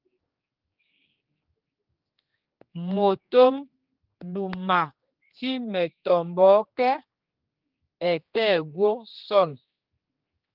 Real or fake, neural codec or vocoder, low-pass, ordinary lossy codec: fake; codec, 16 kHz, 2 kbps, X-Codec, HuBERT features, trained on general audio; 5.4 kHz; Opus, 32 kbps